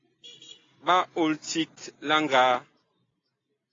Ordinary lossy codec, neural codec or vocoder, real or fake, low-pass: AAC, 32 kbps; none; real; 7.2 kHz